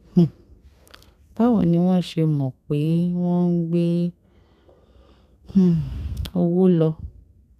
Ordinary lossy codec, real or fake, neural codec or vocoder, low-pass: none; fake; codec, 32 kHz, 1.9 kbps, SNAC; 14.4 kHz